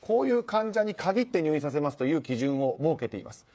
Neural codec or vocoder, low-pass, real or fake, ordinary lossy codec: codec, 16 kHz, 8 kbps, FreqCodec, smaller model; none; fake; none